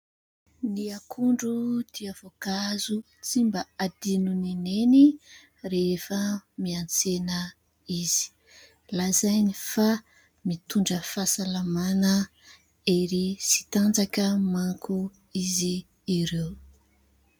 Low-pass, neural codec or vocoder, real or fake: 19.8 kHz; none; real